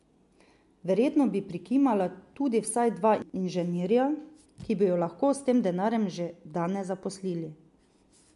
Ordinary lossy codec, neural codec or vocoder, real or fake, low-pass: MP3, 64 kbps; none; real; 10.8 kHz